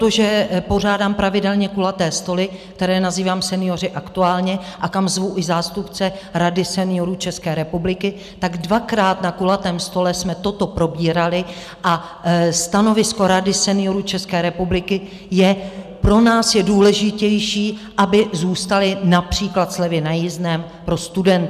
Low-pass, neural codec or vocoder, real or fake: 14.4 kHz; none; real